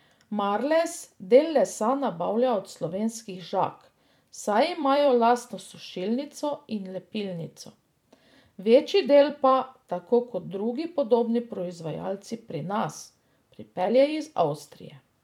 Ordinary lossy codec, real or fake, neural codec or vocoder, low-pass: MP3, 96 kbps; fake; vocoder, 44.1 kHz, 128 mel bands every 256 samples, BigVGAN v2; 19.8 kHz